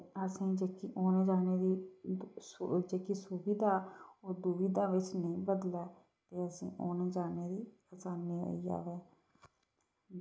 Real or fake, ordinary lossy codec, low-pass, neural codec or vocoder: real; none; none; none